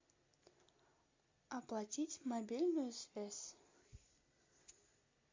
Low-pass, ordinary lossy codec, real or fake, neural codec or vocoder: 7.2 kHz; MP3, 48 kbps; real; none